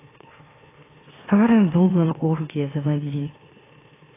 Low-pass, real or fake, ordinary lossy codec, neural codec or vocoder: 3.6 kHz; fake; AAC, 16 kbps; autoencoder, 44.1 kHz, a latent of 192 numbers a frame, MeloTTS